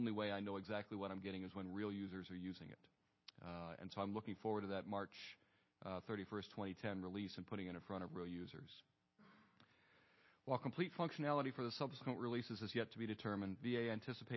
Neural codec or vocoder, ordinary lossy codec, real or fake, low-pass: none; MP3, 24 kbps; real; 7.2 kHz